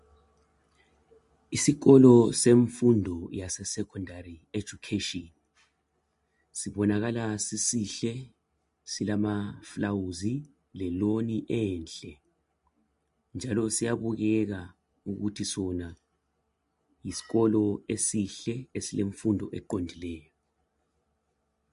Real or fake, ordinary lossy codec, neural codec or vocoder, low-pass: real; MP3, 48 kbps; none; 10.8 kHz